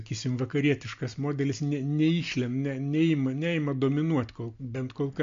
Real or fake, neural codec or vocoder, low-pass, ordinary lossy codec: real; none; 7.2 kHz; MP3, 48 kbps